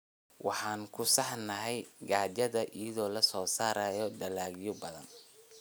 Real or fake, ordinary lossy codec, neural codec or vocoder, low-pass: real; none; none; none